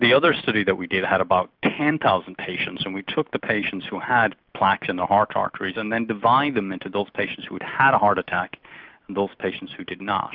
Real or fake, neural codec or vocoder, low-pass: real; none; 5.4 kHz